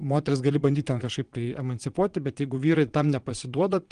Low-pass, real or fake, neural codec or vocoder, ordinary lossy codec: 9.9 kHz; fake; vocoder, 22.05 kHz, 80 mel bands, Vocos; Opus, 24 kbps